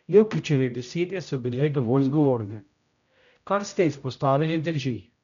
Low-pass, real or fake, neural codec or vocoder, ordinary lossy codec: 7.2 kHz; fake; codec, 16 kHz, 0.5 kbps, X-Codec, HuBERT features, trained on general audio; none